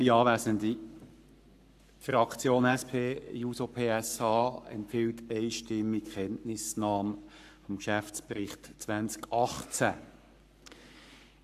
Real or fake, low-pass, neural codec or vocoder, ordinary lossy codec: fake; 14.4 kHz; codec, 44.1 kHz, 7.8 kbps, Pupu-Codec; AAC, 96 kbps